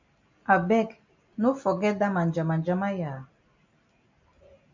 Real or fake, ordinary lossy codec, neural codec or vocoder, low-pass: real; MP3, 64 kbps; none; 7.2 kHz